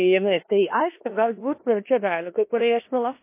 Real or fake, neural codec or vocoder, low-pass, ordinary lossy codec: fake; codec, 16 kHz in and 24 kHz out, 0.4 kbps, LongCat-Audio-Codec, four codebook decoder; 3.6 kHz; MP3, 24 kbps